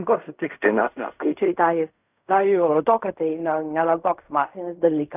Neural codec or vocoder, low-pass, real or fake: codec, 16 kHz in and 24 kHz out, 0.4 kbps, LongCat-Audio-Codec, fine tuned four codebook decoder; 3.6 kHz; fake